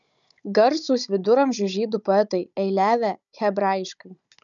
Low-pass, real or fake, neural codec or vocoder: 7.2 kHz; fake; codec, 16 kHz, 16 kbps, FunCodec, trained on Chinese and English, 50 frames a second